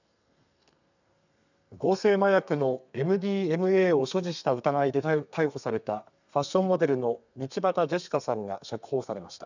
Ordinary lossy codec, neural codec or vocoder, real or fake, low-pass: none; codec, 32 kHz, 1.9 kbps, SNAC; fake; 7.2 kHz